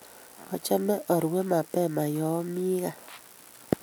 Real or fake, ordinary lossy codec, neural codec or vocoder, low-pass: real; none; none; none